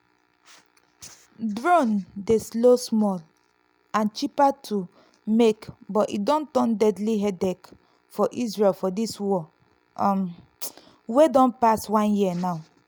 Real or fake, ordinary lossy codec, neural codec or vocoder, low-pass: real; none; none; none